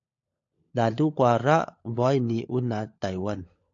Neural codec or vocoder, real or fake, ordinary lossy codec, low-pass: codec, 16 kHz, 16 kbps, FunCodec, trained on LibriTTS, 50 frames a second; fake; AAC, 48 kbps; 7.2 kHz